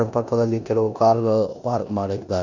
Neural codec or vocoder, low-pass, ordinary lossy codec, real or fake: codec, 16 kHz in and 24 kHz out, 0.9 kbps, LongCat-Audio-Codec, four codebook decoder; 7.2 kHz; none; fake